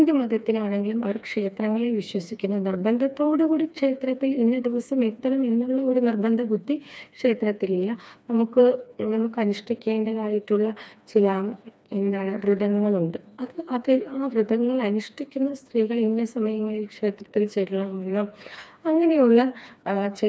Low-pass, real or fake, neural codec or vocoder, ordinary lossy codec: none; fake; codec, 16 kHz, 2 kbps, FreqCodec, smaller model; none